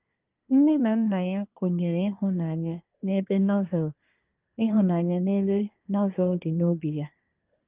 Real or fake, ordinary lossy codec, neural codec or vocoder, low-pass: fake; Opus, 24 kbps; codec, 24 kHz, 1 kbps, SNAC; 3.6 kHz